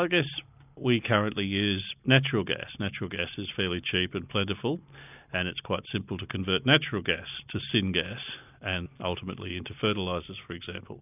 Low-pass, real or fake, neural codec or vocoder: 3.6 kHz; real; none